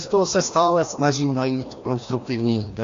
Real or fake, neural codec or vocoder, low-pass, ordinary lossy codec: fake; codec, 16 kHz, 1 kbps, FreqCodec, larger model; 7.2 kHz; AAC, 48 kbps